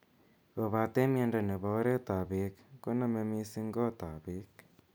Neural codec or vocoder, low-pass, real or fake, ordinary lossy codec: none; none; real; none